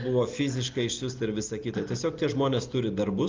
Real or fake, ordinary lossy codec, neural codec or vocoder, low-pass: real; Opus, 24 kbps; none; 7.2 kHz